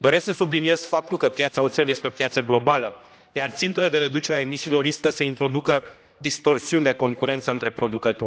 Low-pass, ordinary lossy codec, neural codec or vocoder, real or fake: none; none; codec, 16 kHz, 1 kbps, X-Codec, HuBERT features, trained on general audio; fake